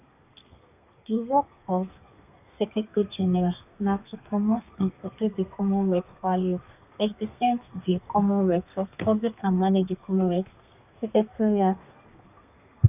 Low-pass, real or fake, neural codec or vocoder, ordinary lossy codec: 3.6 kHz; fake; codec, 32 kHz, 1.9 kbps, SNAC; none